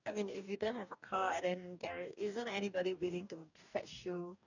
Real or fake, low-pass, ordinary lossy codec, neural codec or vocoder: fake; 7.2 kHz; none; codec, 44.1 kHz, 2.6 kbps, DAC